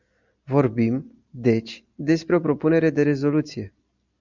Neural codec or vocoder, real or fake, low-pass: none; real; 7.2 kHz